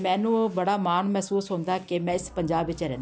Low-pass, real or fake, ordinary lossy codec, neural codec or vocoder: none; real; none; none